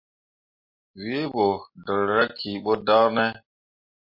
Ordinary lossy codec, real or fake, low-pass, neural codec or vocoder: MP3, 32 kbps; real; 5.4 kHz; none